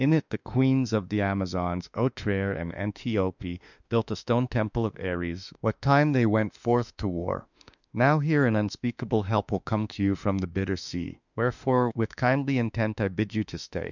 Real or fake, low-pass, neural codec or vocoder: fake; 7.2 kHz; autoencoder, 48 kHz, 32 numbers a frame, DAC-VAE, trained on Japanese speech